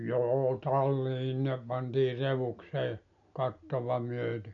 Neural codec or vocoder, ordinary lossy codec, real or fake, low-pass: none; none; real; 7.2 kHz